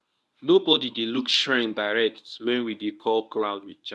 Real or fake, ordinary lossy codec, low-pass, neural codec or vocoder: fake; none; none; codec, 24 kHz, 0.9 kbps, WavTokenizer, medium speech release version 1